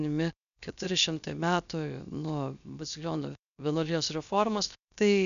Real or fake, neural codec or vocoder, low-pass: fake; codec, 16 kHz, 0.7 kbps, FocalCodec; 7.2 kHz